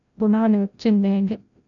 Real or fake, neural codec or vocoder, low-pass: fake; codec, 16 kHz, 0.5 kbps, FreqCodec, larger model; 7.2 kHz